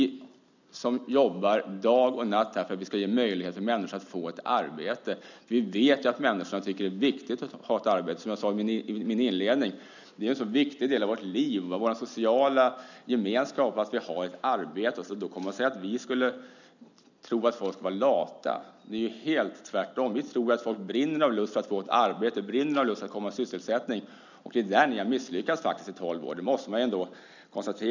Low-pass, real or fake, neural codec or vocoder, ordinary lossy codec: 7.2 kHz; real; none; none